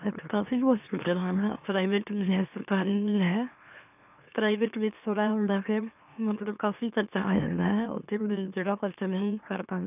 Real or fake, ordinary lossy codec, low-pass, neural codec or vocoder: fake; none; 3.6 kHz; autoencoder, 44.1 kHz, a latent of 192 numbers a frame, MeloTTS